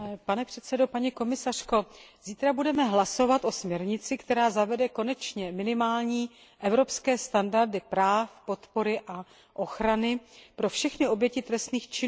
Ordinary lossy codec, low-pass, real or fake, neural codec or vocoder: none; none; real; none